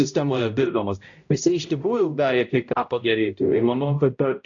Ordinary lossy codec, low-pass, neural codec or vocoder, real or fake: AAC, 48 kbps; 7.2 kHz; codec, 16 kHz, 0.5 kbps, X-Codec, HuBERT features, trained on balanced general audio; fake